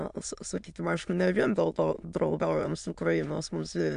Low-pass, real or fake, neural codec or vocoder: 9.9 kHz; fake; autoencoder, 22.05 kHz, a latent of 192 numbers a frame, VITS, trained on many speakers